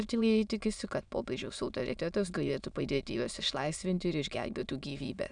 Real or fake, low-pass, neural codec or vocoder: fake; 9.9 kHz; autoencoder, 22.05 kHz, a latent of 192 numbers a frame, VITS, trained on many speakers